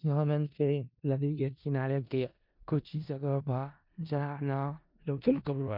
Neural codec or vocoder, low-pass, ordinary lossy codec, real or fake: codec, 16 kHz in and 24 kHz out, 0.4 kbps, LongCat-Audio-Codec, four codebook decoder; 5.4 kHz; MP3, 48 kbps; fake